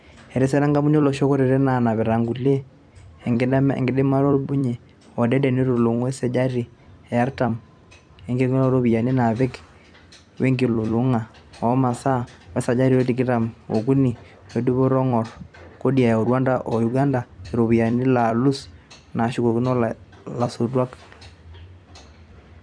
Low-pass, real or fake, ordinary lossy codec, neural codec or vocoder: 9.9 kHz; fake; none; vocoder, 44.1 kHz, 128 mel bands every 256 samples, BigVGAN v2